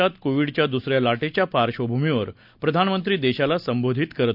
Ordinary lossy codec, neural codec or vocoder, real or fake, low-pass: none; none; real; 5.4 kHz